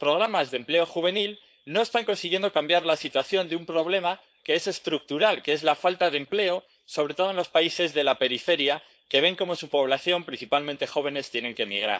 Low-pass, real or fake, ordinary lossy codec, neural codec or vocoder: none; fake; none; codec, 16 kHz, 4.8 kbps, FACodec